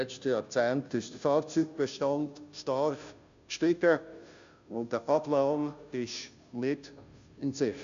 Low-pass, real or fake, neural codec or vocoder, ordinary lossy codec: 7.2 kHz; fake; codec, 16 kHz, 0.5 kbps, FunCodec, trained on Chinese and English, 25 frames a second; MP3, 64 kbps